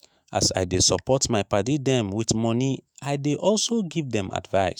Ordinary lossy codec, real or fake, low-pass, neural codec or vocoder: none; fake; none; autoencoder, 48 kHz, 128 numbers a frame, DAC-VAE, trained on Japanese speech